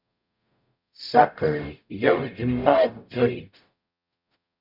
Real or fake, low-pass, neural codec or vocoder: fake; 5.4 kHz; codec, 44.1 kHz, 0.9 kbps, DAC